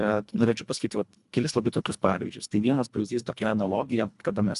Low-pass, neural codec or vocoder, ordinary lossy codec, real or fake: 10.8 kHz; codec, 24 kHz, 1.5 kbps, HILCodec; MP3, 96 kbps; fake